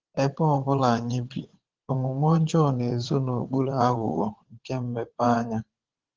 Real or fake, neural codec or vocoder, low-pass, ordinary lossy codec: fake; vocoder, 22.05 kHz, 80 mel bands, WaveNeXt; 7.2 kHz; Opus, 16 kbps